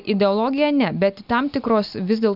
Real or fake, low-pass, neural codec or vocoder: real; 5.4 kHz; none